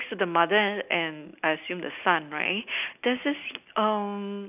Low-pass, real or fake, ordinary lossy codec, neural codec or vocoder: 3.6 kHz; real; none; none